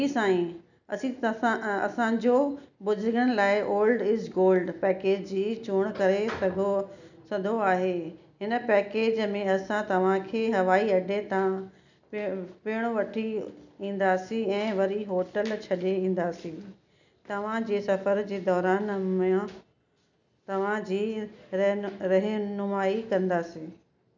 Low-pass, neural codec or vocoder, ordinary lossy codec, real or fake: 7.2 kHz; none; none; real